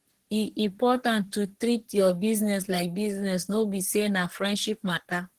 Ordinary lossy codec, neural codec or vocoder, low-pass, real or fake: Opus, 16 kbps; codec, 44.1 kHz, 3.4 kbps, Pupu-Codec; 14.4 kHz; fake